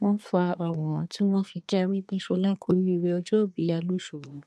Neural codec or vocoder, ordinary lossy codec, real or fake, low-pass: codec, 24 kHz, 1 kbps, SNAC; none; fake; none